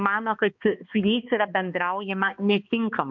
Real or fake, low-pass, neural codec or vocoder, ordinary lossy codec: fake; 7.2 kHz; codec, 16 kHz, 2 kbps, X-Codec, HuBERT features, trained on balanced general audio; MP3, 64 kbps